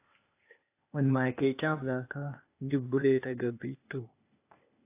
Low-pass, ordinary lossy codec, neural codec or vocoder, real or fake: 3.6 kHz; AAC, 24 kbps; codec, 16 kHz, 0.8 kbps, ZipCodec; fake